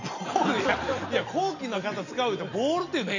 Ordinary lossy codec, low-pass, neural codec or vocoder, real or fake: none; 7.2 kHz; none; real